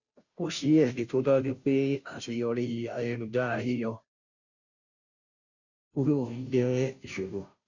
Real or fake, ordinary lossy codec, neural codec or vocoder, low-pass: fake; none; codec, 16 kHz, 0.5 kbps, FunCodec, trained on Chinese and English, 25 frames a second; 7.2 kHz